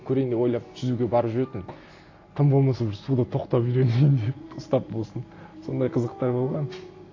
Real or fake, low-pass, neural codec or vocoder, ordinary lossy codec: real; 7.2 kHz; none; AAC, 32 kbps